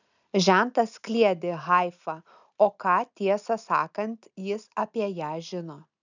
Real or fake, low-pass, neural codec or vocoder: real; 7.2 kHz; none